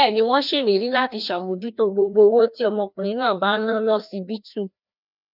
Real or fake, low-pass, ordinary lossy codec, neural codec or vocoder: fake; 5.4 kHz; none; codec, 16 kHz, 1 kbps, FreqCodec, larger model